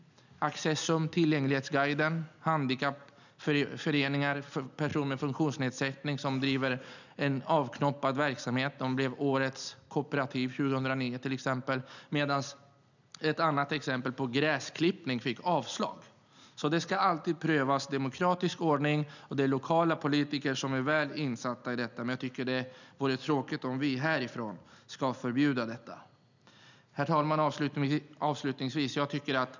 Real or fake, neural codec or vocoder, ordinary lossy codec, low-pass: real; none; none; 7.2 kHz